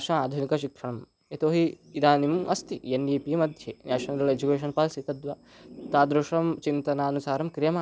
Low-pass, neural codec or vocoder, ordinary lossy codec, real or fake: none; none; none; real